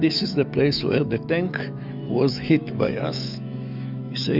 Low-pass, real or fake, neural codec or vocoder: 5.4 kHz; fake; autoencoder, 48 kHz, 128 numbers a frame, DAC-VAE, trained on Japanese speech